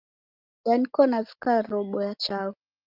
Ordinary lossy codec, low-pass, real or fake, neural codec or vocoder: Opus, 32 kbps; 5.4 kHz; real; none